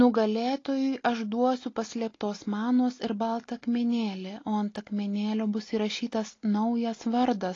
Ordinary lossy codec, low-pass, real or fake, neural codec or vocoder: AAC, 32 kbps; 7.2 kHz; real; none